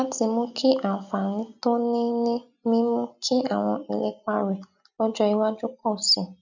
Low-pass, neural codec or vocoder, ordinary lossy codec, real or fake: 7.2 kHz; none; none; real